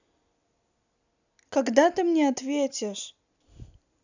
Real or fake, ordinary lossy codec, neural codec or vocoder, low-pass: real; none; none; 7.2 kHz